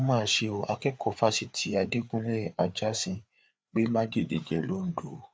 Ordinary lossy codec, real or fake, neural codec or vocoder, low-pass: none; fake; codec, 16 kHz, 4 kbps, FreqCodec, larger model; none